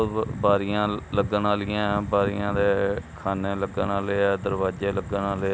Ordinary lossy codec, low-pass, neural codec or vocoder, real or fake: none; none; none; real